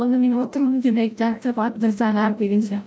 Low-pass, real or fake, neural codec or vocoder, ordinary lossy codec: none; fake; codec, 16 kHz, 0.5 kbps, FreqCodec, larger model; none